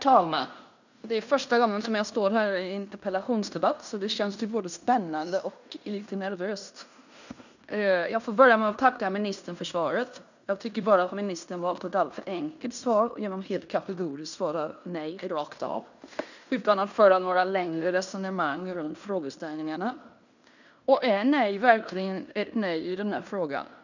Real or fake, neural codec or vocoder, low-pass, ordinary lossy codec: fake; codec, 16 kHz in and 24 kHz out, 0.9 kbps, LongCat-Audio-Codec, fine tuned four codebook decoder; 7.2 kHz; none